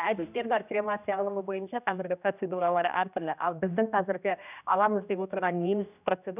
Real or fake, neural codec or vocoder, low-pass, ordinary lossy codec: fake; codec, 16 kHz, 1 kbps, X-Codec, HuBERT features, trained on general audio; 3.6 kHz; none